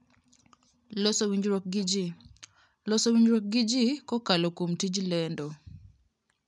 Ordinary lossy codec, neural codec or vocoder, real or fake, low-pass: none; none; real; 10.8 kHz